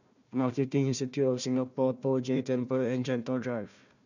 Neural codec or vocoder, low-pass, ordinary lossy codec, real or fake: codec, 16 kHz, 1 kbps, FunCodec, trained on Chinese and English, 50 frames a second; 7.2 kHz; none; fake